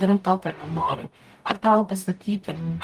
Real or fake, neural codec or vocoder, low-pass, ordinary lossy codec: fake; codec, 44.1 kHz, 0.9 kbps, DAC; 14.4 kHz; Opus, 32 kbps